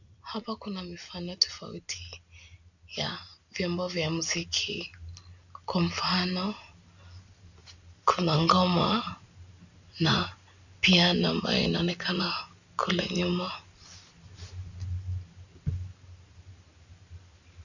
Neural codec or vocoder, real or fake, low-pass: none; real; 7.2 kHz